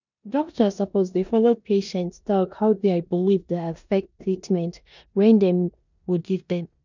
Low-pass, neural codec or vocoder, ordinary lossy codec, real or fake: 7.2 kHz; codec, 16 kHz in and 24 kHz out, 0.9 kbps, LongCat-Audio-Codec, four codebook decoder; none; fake